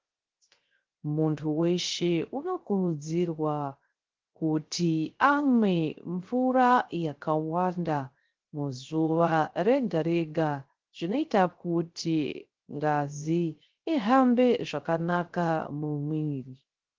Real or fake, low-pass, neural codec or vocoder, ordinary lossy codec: fake; 7.2 kHz; codec, 16 kHz, 0.3 kbps, FocalCodec; Opus, 16 kbps